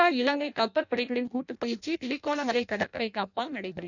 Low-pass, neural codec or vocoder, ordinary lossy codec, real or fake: 7.2 kHz; codec, 16 kHz in and 24 kHz out, 0.6 kbps, FireRedTTS-2 codec; none; fake